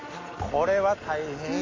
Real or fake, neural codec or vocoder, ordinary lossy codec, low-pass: real; none; AAC, 32 kbps; 7.2 kHz